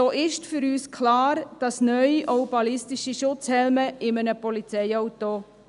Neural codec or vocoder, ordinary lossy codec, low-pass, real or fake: none; none; 10.8 kHz; real